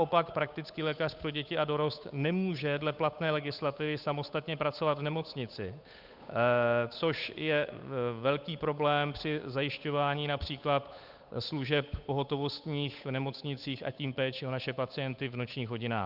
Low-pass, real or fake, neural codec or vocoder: 5.4 kHz; fake; codec, 16 kHz, 8 kbps, FunCodec, trained on Chinese and English, 25 frames a second